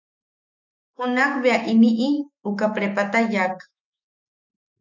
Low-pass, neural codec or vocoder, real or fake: 7.2 kHz; autoencoder, 48 kHz, 128 numbers a frame, DAC-VAE, trained on Japanese speech; fake